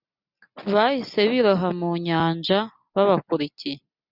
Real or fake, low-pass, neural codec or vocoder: real; 5.4 kHz; none